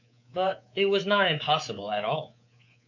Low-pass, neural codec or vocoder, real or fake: 7.2 kHz; codec, 24 kHz, 3.1 kbps, DualCodec; fake